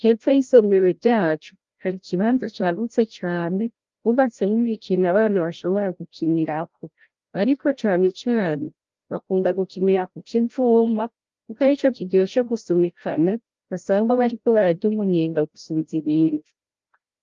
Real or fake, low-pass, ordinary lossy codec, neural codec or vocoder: fake; 7.2 kHz; Opus, 32 kbps; codec, 16 kHz, 0.5 kbps, FreqCodec, larger model